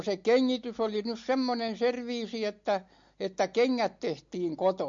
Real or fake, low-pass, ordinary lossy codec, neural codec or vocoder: real; 7.2 kHz; MP3, 48 kbps; none